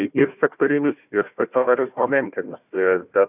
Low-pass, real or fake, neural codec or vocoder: 3.6 kHz; fake; codec, 16 kHz, 1 kbps, FunCodec, trained on Chinese and English, 50 frames a second